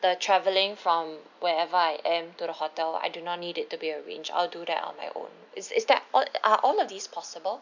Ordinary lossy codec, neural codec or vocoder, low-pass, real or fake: none; none; 7.2 kHz; real